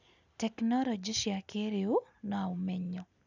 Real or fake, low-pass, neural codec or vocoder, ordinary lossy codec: real; 7.2 kHz; none; none